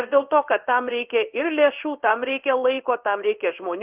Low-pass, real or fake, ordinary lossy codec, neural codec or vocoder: 3.6 kHz; fake; Opus, 32 kbps; codec, 16 kHz in and 24 kHz out, 1 kbps, XY-Tokenizer